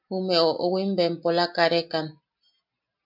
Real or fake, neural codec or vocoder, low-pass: real; none; 5.4 kHz